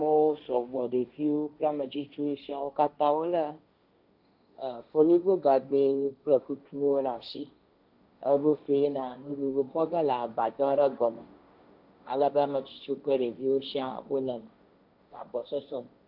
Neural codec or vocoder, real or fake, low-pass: codec, 16 kHz, 1.1 kbps, Voila-Tokenizer; fake; 5.4 kHz